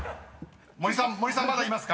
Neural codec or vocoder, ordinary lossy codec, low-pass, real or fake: none; none; none; real